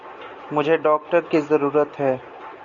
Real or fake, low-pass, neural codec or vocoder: real; 7.2 kHz; none